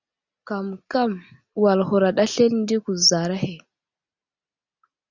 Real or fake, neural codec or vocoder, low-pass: real; none; 7.2 kHz